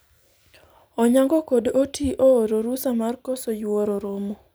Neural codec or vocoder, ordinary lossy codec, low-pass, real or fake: none; none; none; real